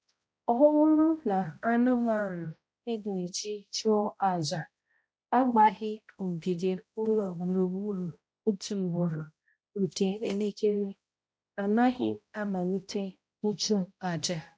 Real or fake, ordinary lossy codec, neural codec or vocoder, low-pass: fake; none; codec, 16 kHz, 0.5 kbps, X-Codec, HuBERT features, trained on balanced general audio; none